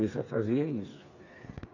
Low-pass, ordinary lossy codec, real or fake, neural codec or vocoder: 7.2 kHz; none; fake; codec, 16 kHz, 4 kbps, FreqCodec, smaller model